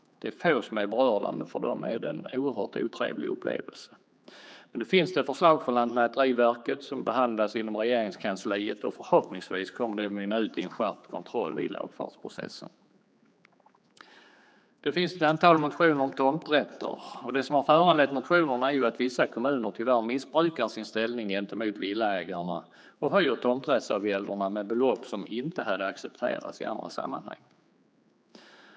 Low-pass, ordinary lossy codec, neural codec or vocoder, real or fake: none; none; codec, 16 kHz, 4 kbps, X-Codec, HuBERT features, trained on general audio; fake